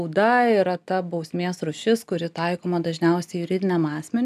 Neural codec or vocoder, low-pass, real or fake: none; 14.4 kHz; real